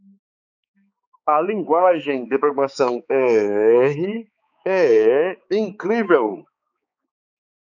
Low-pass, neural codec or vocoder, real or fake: 7.2 kHz; codec, 16 kHz, 4 kbps, X-Codec, HuBERT features, trained on balanced general audio; fake